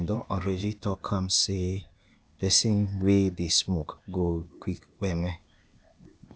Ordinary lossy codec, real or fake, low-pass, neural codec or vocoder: none; fake; none; codec, 16 kHz, 0.8 kbps, ZipCodec